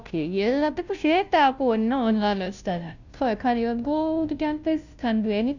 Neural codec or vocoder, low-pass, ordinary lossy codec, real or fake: codec, 16 kHz, 0.5 kbps, FunCodec, trained on Chinese and English, 25 frames a second; 7.2 kHz; none; fake